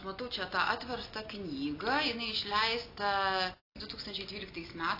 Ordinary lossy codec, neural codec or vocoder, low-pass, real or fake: AAC, 32 kbps; none; 5.4 kHz; real